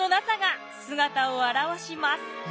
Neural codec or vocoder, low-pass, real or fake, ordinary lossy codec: none; none; real; none